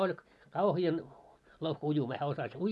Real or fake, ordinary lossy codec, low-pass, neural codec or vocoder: real; none; none; none